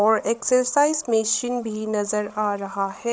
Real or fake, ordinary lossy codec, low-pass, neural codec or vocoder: fake; none; none; codec, 16 kHz, 16 kbps, FreqCodec, larger model